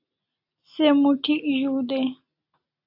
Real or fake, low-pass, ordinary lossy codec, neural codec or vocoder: real; 5.4 kHz; AAC, 32 kbps; none